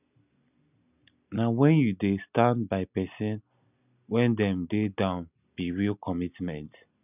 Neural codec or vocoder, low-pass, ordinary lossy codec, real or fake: none; 3.6 kHz; none; real